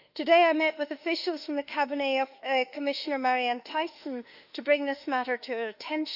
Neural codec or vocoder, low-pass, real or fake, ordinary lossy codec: autoencoder, 48 kHz, 32 numbers a frame, DAC-VAE, trained on Japanese speech; 5.4 kHz; fake; none